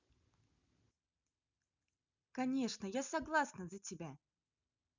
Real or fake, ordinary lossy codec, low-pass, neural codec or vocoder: real; none; 7.2 kHz; none